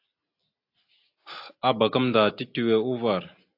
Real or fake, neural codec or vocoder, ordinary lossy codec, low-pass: real; none; AAC, 32 kbps; 5.4 kHz